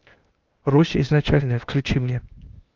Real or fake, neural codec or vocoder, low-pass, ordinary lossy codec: fake; codec, 16 kHz, 0.8 kbps, ZipCodec; 7.2 kHz; Opus, 24 kbps